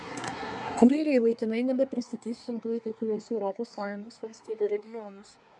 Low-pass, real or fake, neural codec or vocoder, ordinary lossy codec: 10.8 kHz; fake; codec, 24 kHz, 1 kbps, SNAC; MP3, 96 kbps